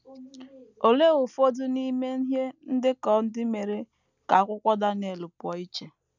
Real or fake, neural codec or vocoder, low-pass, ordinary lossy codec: real; none; 7.2 kHz; none